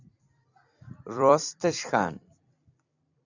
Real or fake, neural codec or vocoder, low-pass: fake; vocoder, 44.1 kHz, 128 mel bands every 256 samples, BigVGAN v2; 7.2 kHz